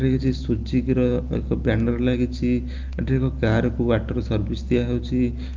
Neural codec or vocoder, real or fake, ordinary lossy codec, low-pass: none; real; Opus, 32 kbps; 7.2 kHz